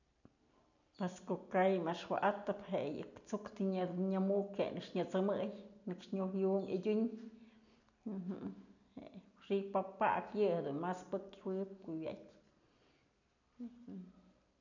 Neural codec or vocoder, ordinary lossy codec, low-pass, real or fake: none; none; 7.2 kHz; real